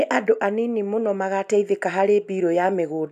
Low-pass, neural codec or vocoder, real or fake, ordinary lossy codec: 14.4 kHz; none; real; none